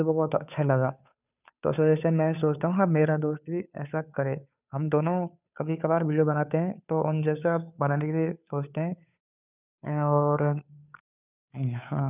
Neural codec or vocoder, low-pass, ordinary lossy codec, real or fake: codec, 16 kHz, 2 kbps, FunCodec, trained on Chinese and English, 25 frames a second; 3.6 kHz; none; fake